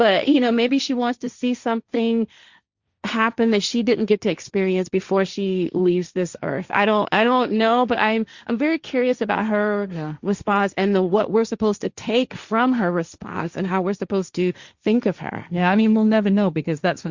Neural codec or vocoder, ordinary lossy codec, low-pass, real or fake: codec, 16 kHz, 1.1 kbps, Voila-Tokenizer; Opus, 64 kbps; 7.2 kHz; fake